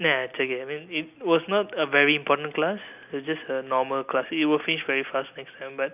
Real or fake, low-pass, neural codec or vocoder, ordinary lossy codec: real; 3.6 kHz; none; none